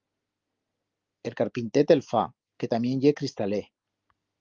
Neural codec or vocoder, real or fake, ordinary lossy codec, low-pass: none; real; Opus, 32 kbps; 7.2 kHz